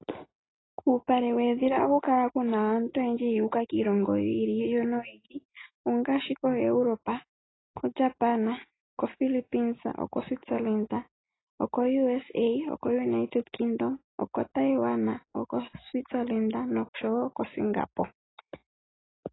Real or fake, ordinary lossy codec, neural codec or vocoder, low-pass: real; AAC, 16 kbps; none; 7.2 kHz